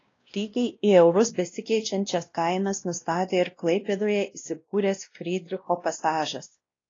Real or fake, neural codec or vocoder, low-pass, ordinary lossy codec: fake; codec, 16 kHz, 1 kbps, X-Codec, WavLM features, trained on Multilingual LibriSpeech; 7.2 kHz; AAC, 32 kbps